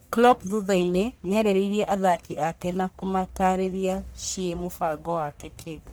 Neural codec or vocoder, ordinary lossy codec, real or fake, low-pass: codec, 44.1 kHz, 1.7 kbps, Pupu-Codec; none; fake; none